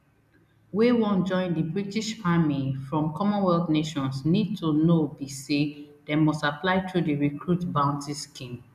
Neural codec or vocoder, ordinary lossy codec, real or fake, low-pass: none; none; real; 14.4 kHz